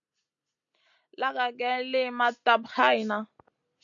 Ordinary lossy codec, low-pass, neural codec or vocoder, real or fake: MP3, 64 kbps; 7.2 kHz; none; real